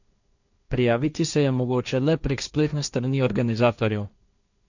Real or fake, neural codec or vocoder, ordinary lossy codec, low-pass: fake; codec, 16 kHz, 1.1 kbps, Voila-Tokenizer; none; 7.2 kHz